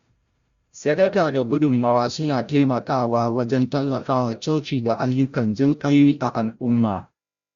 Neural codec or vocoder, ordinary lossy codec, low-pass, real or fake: codec, 16 kHz, 0.5 kbps, FreqCodec, larger model; Opus, 64 kbps; 7.2 kHz; fake